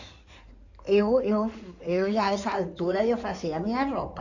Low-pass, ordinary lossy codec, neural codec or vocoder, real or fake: 7.2 kHz; none; codec, 16 kHz in and 24 kHz out, 2.2 kbps, FireRedTTS-2 codec; fake